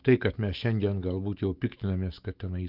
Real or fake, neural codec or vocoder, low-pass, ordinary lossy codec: fake; autoencoder, 48 kHz, 128 numbers a frame, DAC-VAE, trained on Japanese speech; 5.4 kHz; Opus, 32 kbps